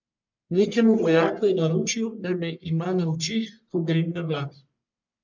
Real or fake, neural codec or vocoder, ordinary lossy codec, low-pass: fake; codec, 44.1 kHz, 1.7 kbps, Pupu-Codec; MP3, 64 kbps; 7.2 kHz